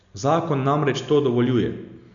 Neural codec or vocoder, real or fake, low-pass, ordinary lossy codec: none; real; 7.2 kHz; none